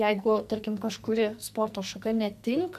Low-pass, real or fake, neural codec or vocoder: 14.4 kHz; fake; codec, 44.1 kHz, 2.6 kbps, SNAC